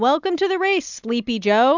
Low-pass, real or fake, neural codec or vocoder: 7.2 kHz; real; none